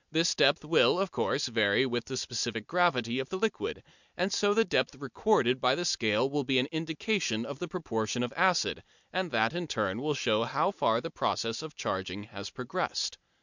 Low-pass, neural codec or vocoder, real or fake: 7.2 kHz; none; real